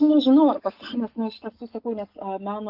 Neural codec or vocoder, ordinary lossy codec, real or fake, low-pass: none; AAC, 48 kbps; real; 5.4 kHz